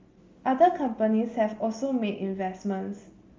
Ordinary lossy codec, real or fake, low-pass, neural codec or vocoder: Opus, 32 kbps; real; 7.2 kHz; none